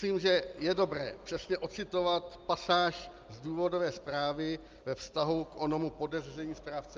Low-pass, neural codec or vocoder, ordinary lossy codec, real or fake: 7.2 kHz; none; Opus, 24 kbps; real